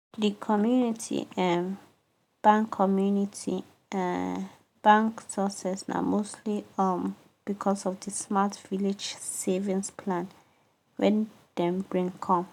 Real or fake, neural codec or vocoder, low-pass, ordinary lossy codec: real; none; 19.8 kHz; none